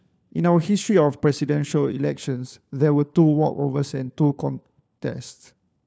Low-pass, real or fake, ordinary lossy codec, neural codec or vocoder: none; fake; none; codec, 16 kHz, 4 kbps, FunCodec, trained on LibriTTS, 50 frames a second